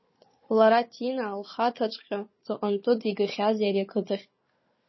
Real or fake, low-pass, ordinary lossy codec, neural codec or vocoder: fake; 7.2 kHz; MP3, 24 kbps; codec, 16 kHz, 4 kbps, FunCodec, trained on Chinese and English, 50 frames a second